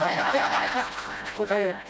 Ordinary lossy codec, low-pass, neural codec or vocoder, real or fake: none; none; codec, 16 kHz, 0.5 kbps, FreqCodec, smaller model; fake